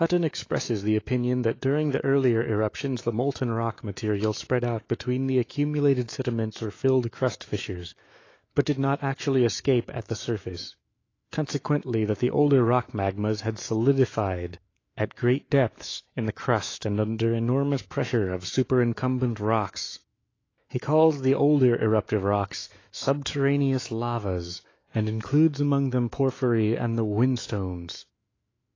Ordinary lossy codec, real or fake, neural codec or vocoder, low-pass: AAC, 32 kbps; fake; autoencoder, 48 kHz, 128 numbers a frame, DAC-VAE, trained on Japanese speech; 7.2 kHz